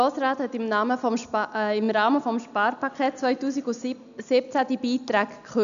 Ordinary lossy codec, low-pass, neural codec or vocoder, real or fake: none; 7.2 kHz; none; real